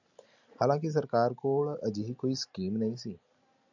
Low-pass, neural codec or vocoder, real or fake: 7.2 kHz; none; real